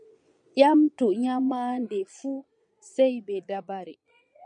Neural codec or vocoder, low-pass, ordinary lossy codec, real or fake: vocoder, 22.05 kHz, 80 mel bands, Vocos; 9.9 kHz; MP3, 96 kbps; fake